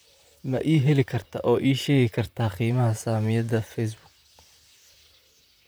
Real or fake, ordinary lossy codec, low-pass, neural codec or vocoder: fake; none; none; vocoder, 44.1 kHz, 128 mel bands, Pupu-Vocoder